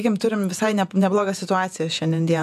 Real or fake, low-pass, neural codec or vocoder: fake; 14.4 kHz; vocoder, 48 kHz, 128 mel bands, Vocos